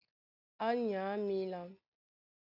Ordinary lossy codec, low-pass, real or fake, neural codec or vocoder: AAC, 48 kbps; 5.4 kHz; real; none